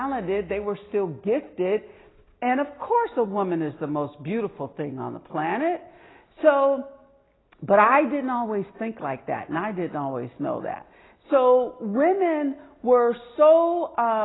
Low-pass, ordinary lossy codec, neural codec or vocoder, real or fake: 7.2 kHz; AAC, 16 kbps; none; real